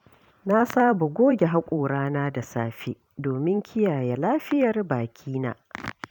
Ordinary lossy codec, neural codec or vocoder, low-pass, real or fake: none; vocoder, 44.1 kHz, 128 mel bands every 512 samples, BigVGAN v2; 19.8 kHz; fake